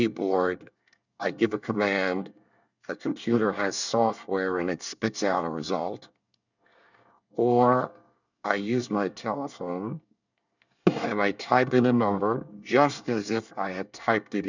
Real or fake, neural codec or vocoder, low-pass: fake; codec, 24 kHz, 1 kbps, SNAC; 7.2 kHz